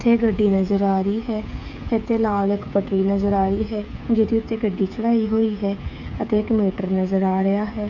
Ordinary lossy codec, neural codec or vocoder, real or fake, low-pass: none; codec, 16 kHz, 8 kbps, FreqCodec, smaller model; fake; 7.2 kHz